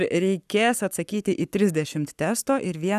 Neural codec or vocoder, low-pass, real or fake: codec, 44.1 kHz, 7.8 kbps, Pupu-Codec; 14.4 kHz; fake